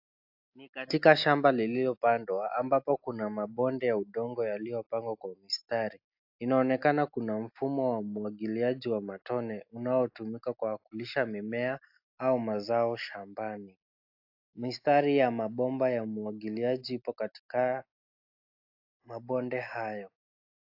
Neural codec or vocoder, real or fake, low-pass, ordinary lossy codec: none; real; 5.4 kHz; AAC, 48 kbps